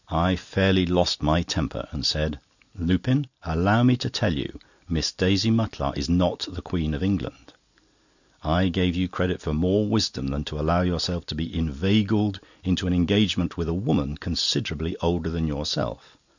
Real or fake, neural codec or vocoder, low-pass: real; none; 7.2 kHz